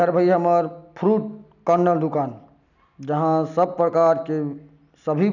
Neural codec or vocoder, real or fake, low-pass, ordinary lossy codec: none; real; 7.2 kHz; none